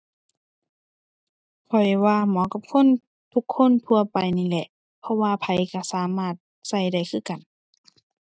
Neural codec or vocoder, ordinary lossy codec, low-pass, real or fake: none; none; none; real